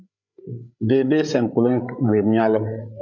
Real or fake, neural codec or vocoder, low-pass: fake; codec, 16 kHz, 8 kbps, FreqCodec, larger model; 7.2 kHz